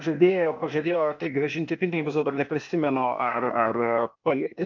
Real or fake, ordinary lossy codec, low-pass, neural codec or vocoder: fake; MP3, 48 kbps; 7.2 kHz; codec, 16 kHz, 0.8 kbps, ZipCodec